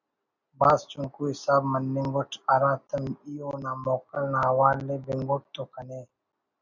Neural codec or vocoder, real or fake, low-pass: none; real; 7.2 kHz